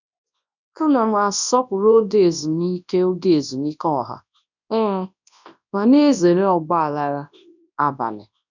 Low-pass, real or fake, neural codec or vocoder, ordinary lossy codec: 7.2 kHz; fake; codec, 24 kHz, 0.9 kbps, WavTokenizer, large speech release; none